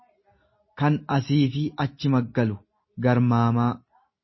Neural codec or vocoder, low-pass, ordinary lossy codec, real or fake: none; 7.2 kHz; MP3, 24 kbps; real